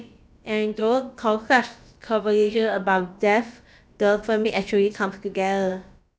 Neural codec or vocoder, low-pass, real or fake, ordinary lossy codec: codec, 16 kHz, about 1 kbps, DyCAST, with the encoder's durations; none; fake; none